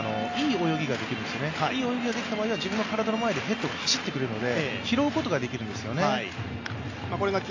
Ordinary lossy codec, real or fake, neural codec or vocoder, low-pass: AAC, 48 kbps; real; none; 7.2 kHz